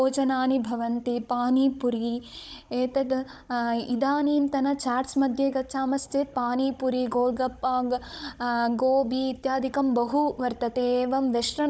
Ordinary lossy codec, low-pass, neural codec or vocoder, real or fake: none; none; codec, 16 kHz, 16 kbps, FunCodec, trained on Chinese and English, 50 frames a second; fake